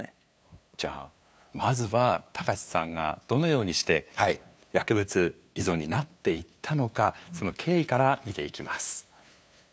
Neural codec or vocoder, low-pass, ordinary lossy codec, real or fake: codec, 16 kHz, 2 kbps, FunCodec, trained on LibriTTS, 25 frames a second; none; none; fake